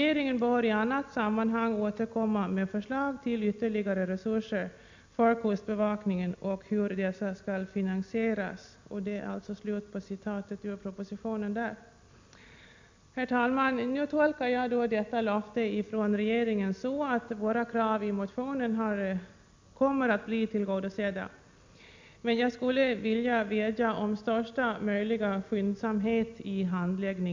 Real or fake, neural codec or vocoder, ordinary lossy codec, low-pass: real; none; MP3, 64 kbps; 7.2 kHz